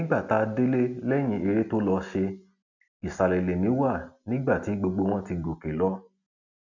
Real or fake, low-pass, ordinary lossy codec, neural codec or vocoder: real; 7.2 kHz; none; none